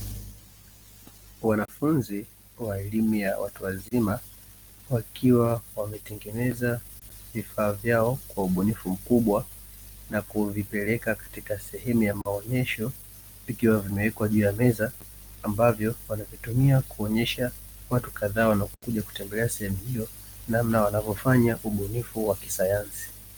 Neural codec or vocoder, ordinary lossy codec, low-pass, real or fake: none; Opus, 24 kbps; 19.8 kHz; real